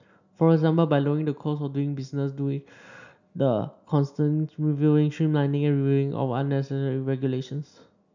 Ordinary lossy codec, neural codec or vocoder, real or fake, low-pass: none; none; real; 7.2 kHz